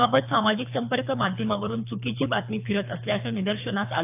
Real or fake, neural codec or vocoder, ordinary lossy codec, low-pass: fake; codec, 24 kHz, 3 kbps, HILCodec; AAC, 32 kbps; 3.6 kHz